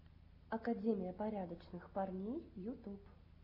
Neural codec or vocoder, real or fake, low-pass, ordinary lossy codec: none; real; 5.4 kHz; AAC, 32 kbps